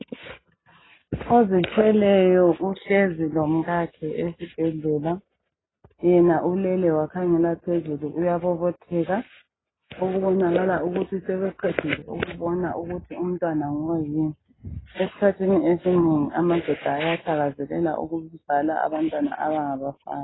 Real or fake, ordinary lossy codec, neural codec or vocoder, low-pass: real; AAC, 16 kbps; none; 7.2 kHz